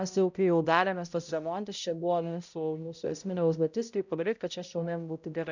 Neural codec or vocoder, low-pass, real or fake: codec, 16 kHz, 0.5 kbps, X-Codec, HuBERT features, trained on balanced general audio; 7.2 kHz; fake